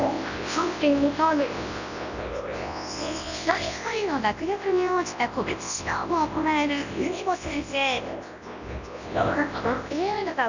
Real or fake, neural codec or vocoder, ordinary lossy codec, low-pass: fake; codec, 24 kHz, 0.9 kbps, WavTokenizer, large speech release; none; 7.2 kHz